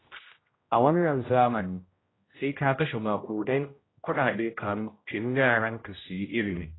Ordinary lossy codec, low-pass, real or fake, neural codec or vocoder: AAC, 16 kbps; 7.2 kHz; fake; codec, 16 kHz, 0.5 kbps, X-Codec, HuBERT features, trained on general audio